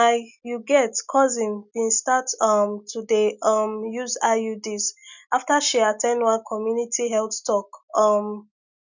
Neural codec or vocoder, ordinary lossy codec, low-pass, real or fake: none; none; 7.2 kHz; real